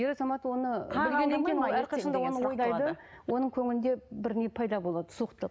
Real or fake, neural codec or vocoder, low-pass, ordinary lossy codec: real; none; none; none